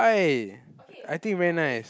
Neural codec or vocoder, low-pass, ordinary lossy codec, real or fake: none; none; none; real